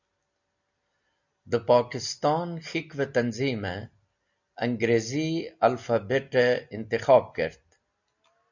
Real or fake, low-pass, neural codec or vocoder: real; 7.2 kHz; none